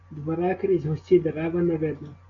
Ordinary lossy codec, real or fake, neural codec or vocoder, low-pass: AAC, 32 kbps; real; none; 7.2 kHz